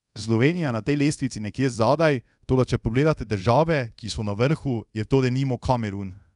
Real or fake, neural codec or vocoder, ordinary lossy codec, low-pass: fake; codec, 24 kHz, 0.5 kbps, DualCodec; none; 10.8 kHz